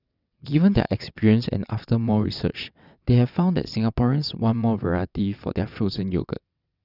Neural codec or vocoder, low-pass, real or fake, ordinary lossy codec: vocoder, 22.05 kHz, 80 mel bands, WaveNeXt; 5.4 kHz; fake; none